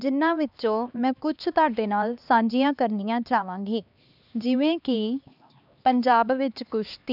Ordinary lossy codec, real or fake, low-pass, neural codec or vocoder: none; fake; 5.4 kHz; codec, 16 kHz, 2 kbps, X-Codec, HuBERT features, trained on LibriSpeech